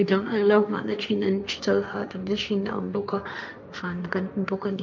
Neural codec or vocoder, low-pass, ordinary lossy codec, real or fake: codec, 16 kHz, 1.1 kbps, Voila-Tokenizer; none; none; fake